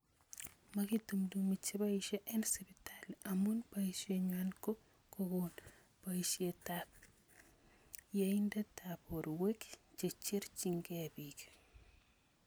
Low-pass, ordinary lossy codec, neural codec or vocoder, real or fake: none; none; none; real